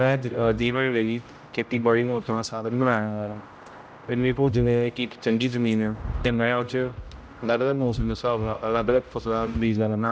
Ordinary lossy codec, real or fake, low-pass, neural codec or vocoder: none; fake; none; codec, 16 kHz, 0.5 kbps, X-Codec, HuBERT features, trained on general audio